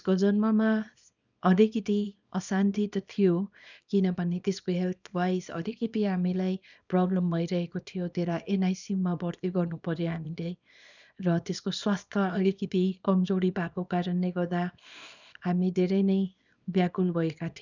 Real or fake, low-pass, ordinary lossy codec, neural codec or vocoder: fake; 7.2 kHz; none; codec, 24 kHz, 0.9 kbps, WavTokenizer, small release